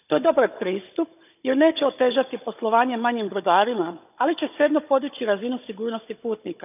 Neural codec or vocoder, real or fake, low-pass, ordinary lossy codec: codec, 16 kHz, 16 kbps, FunCodec, trained on Chinese and English, 50 frames a second; fake; 3.6 kHz; none